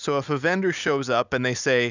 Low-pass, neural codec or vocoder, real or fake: 7.2 kHz; none; real